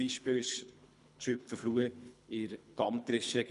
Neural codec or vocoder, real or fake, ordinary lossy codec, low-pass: codec, 24 kHz, 3 kbps, HILCodec; fake; none; 10.8 kHz